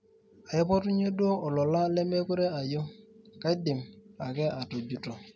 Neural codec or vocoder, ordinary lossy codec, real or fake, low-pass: none; none; real; none